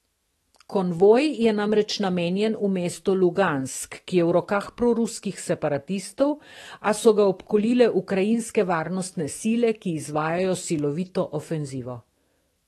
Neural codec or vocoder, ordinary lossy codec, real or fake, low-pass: autoencoder, 48 kHz, 128 numbers a frame, DAC-VAE, trained on Japanese speech; AAC, 32 kbps; fake; 19.8 kHz